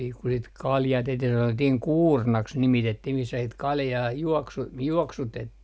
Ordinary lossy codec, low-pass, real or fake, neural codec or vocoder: none; none; real; none